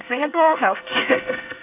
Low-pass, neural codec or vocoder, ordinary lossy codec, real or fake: 3.6 kHz; codec, 24 kHz, 1 kbps, SNAC; AAC, 24 kbps; fake